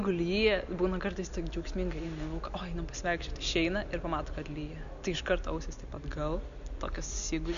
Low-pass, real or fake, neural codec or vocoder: 7.2 kHz; real; none